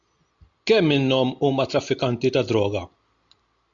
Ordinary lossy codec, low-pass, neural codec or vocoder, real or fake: MP3, 64 kbps; 7.2 kHz; none; real